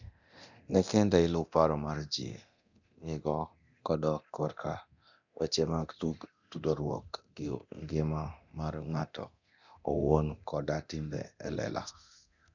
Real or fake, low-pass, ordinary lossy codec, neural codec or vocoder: fake; 7.2 kHz; none; codec, 24 kHz, 0.9 kbps, DualCodec